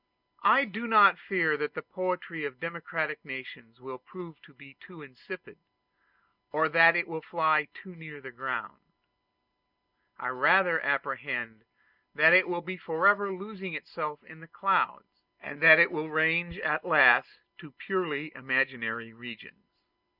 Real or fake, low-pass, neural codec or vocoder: real; 5.4 kHz; none